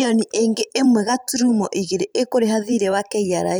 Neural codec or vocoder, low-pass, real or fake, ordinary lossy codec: vocoder, 44.1 kHz, 128 mel bands every 512 samples, BigVGAN v2; none; fake; none